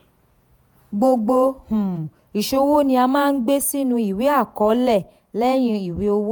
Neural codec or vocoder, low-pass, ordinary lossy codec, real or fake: vocoder, 48 kHz, 128 mel bands, Vocos; none; none; fake